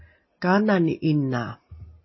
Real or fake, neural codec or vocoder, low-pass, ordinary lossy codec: real; none; 7.2 kHz; MP3, 24 kbps